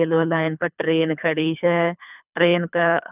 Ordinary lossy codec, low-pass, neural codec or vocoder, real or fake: none; 3.6 kHz; codec, 24 kHz, 3 kbps, HILCodec; fake